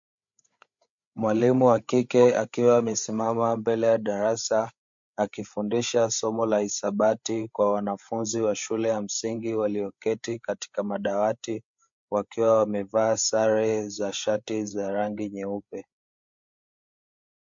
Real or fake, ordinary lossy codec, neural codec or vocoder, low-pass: fake; MP3, 48 kbps; codec, 16 kHz, 8 kbps, FreqCodec, larger model; 7.2 kHz